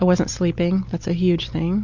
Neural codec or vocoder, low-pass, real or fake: none; 7.2 kHz; real